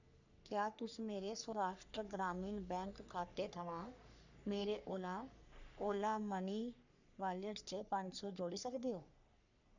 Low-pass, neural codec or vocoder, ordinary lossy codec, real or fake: 7.2 kHz; codec, 44.1 kHz, 3.4 kbps, Pupu-Codec; none; fake